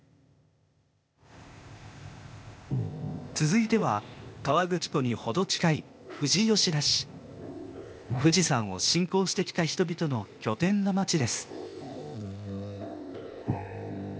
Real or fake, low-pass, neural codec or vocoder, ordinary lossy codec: fake; none; codec, 16 kHz, 0.8 kbps, ZipCodec; none